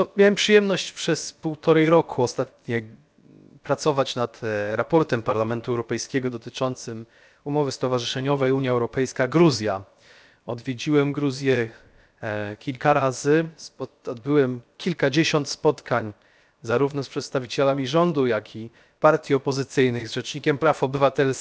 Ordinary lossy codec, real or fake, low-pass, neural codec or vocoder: none; fake; none; codec, 16 kHz, 0.7 kbps, FocalCodec